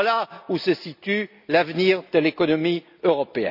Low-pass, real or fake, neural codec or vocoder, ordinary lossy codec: 5.4 kHz; real; none; none